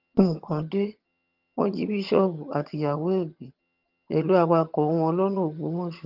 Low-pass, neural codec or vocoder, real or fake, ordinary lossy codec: 5.4 kHz; vocoder, 22.05 kHz, 80 mel bands, HiFi-GAN; fake; Opus, 24 kbps